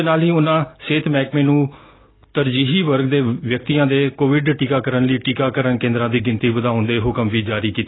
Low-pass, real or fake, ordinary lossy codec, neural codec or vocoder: 7.2 kHz; real; AAC, 16 kbps; none